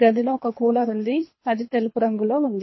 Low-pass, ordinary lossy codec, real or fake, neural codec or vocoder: 7.2 kHz; MP3, 24 kbps; fake; codec, 24 kHz, 3 kbps, HILCodec